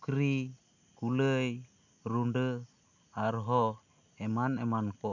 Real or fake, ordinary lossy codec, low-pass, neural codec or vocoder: real; none; 7.2 kHz; none